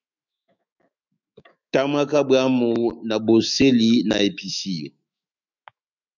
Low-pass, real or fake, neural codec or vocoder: 7.2 kHz; fake; autoencoder, 48 kHz, 128 numbers a frame, DAC-VAE, trained on Japanese speech